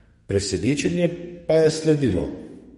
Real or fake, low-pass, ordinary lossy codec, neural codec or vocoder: fake; 14.4 kHz; MP3, 48 kbps; codec, 32 kHz, 1.9 kbps, SNAC